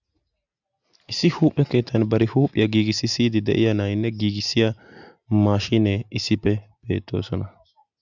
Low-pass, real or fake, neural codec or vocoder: 7.2 kHz; real; none